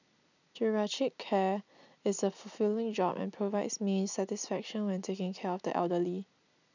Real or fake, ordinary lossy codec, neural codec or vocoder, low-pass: real; none; none; 7.2 kHz